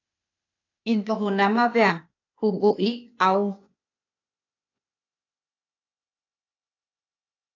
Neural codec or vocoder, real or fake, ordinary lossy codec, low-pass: codec, 16 kHz, 0.8 kbps, ZipCodec; fake; AAC, 48 kbps; 7.2 kHz